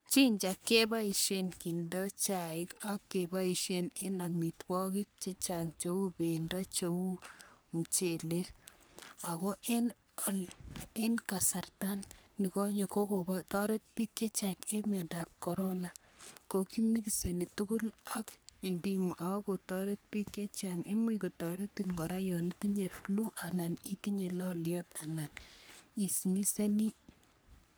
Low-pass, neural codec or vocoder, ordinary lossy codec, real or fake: none; codec, 44.1 kHz, 3.4 kbps, Pupu-Codec; none; fake